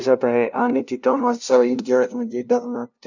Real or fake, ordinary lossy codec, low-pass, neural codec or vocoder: fake; none; 7.2 kHz; codec, 16 kHz, 0.5 kbps, FunCodec, trained on LibriTTS, 25 frames a second